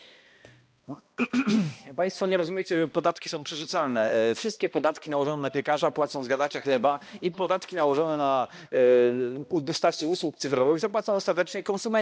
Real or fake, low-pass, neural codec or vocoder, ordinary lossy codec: fake; none; codec, 16 kHz, 1 kbps, X-Codec, HuBERT features, trained on balanced general audio; none